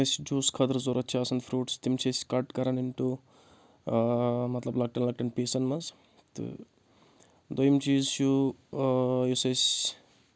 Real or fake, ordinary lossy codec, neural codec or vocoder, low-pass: real; none; none; none